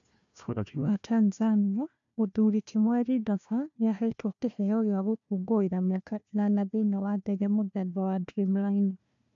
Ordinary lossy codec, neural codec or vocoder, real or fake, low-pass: AAC, 64 kbps; codec, 16 kHz, 1 kbps, FunCodec, trained on Chinese and English, 50 frames a second; fake; 7.2 kHz